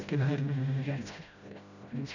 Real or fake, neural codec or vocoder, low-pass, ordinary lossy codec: fake; codec, 16 kHz, 0.5 kbps, FreqCodec, smaller model; 7.2 kHz; none